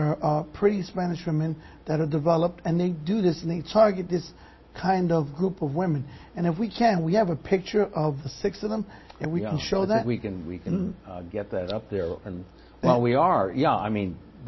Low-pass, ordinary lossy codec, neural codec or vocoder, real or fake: 7.2 kHz; MP3, 24 kbps; none; real